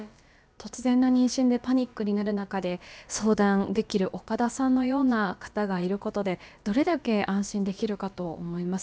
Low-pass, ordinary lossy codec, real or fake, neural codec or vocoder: none; none; fake; codec, 16 kHz, about 1 kbps, DyCAST, with the encoder's durations